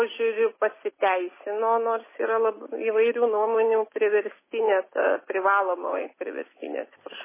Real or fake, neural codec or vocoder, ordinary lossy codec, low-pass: real; none; MP3, 16 kbps; 3.6 kHz